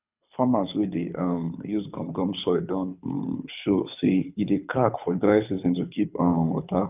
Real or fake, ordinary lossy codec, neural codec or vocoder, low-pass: fake; none; codec, 24 kHz, 6 kbps, HILCodec; 3.6 kHz